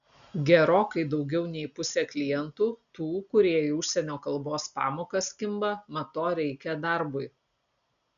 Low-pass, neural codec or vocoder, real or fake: 7.2 kHz; none; real